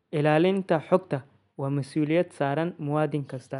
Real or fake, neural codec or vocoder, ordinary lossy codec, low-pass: real; none; none; 10.8 kHz